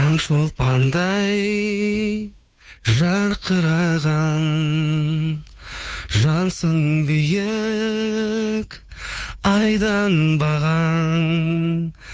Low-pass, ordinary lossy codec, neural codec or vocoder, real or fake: none; none; codec, 16 kHz, 2 kbps, FunCodec, trained on Chinese and English, 25 frames a second; fake